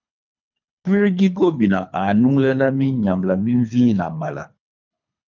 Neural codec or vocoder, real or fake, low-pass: codec, 24 kHz, 3 kbps, HILCodec; fake; 7.2 kHz